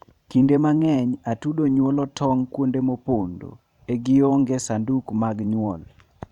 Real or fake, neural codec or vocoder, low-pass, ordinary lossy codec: fake; vocoder, 44.1 kHz, 128 mel bands every 512 samples, BigVGAN v2; 19.8 kHz; none